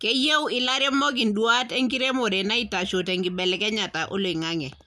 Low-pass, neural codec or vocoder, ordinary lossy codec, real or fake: none; none; none; real